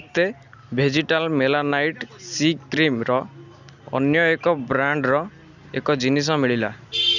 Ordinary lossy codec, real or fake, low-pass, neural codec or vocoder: none; real; 7.2 kHz; none